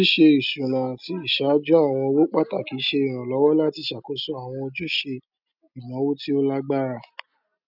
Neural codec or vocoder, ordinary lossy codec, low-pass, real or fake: none; none; 5.4 kHz; real